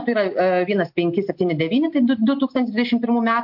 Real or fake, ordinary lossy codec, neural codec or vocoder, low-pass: real; MP3, 48 kbps; none; 5.4 kHz